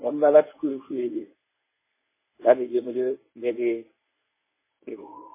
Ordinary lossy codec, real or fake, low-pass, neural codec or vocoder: MP3, 16 kbps; fake; 3.6 kHz; codec, 24 kHz, 0.9 kbps, WavTokenizer, medium speech release version 1